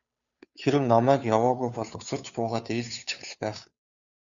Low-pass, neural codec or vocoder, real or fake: 7.2 kHz; codec, 16 kHz, 2 kbps, FunCodec, trained on Chinese and English, 25 frames a second; fake